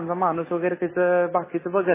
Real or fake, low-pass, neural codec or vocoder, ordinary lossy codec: real; 3.6 kHz; none; MP3, 16 kbps